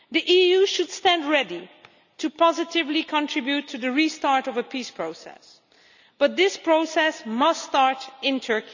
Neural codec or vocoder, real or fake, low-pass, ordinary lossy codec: none; real; 7.2 kHz; none